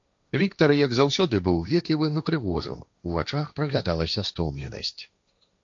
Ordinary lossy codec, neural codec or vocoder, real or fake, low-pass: AAC, 64 kbps; codec, 16 kHz, 1.1 kbps, Voila-Tokenizer; fake; 7.2 kHz